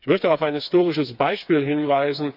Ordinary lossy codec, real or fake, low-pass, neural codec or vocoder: none; fake; 5.4 kHz; codec, 16 kHz, 4 kbps, FreqCodec, smaller model